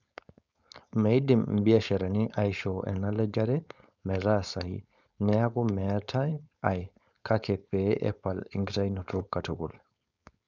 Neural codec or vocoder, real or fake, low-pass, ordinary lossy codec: codec, 16 kHz, 4.8 kbps, FACodec; fake; 7.2 kHz; none